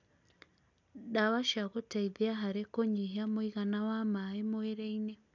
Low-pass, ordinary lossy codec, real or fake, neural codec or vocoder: 7.2 kHz; none; real; none